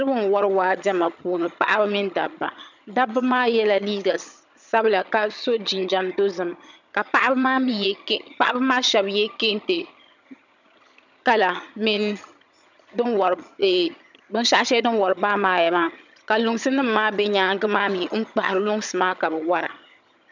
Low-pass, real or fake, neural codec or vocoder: 7.2 kHz; fake; vocoder, 22.05 kHz, 80 mel bands, HiFi-GAN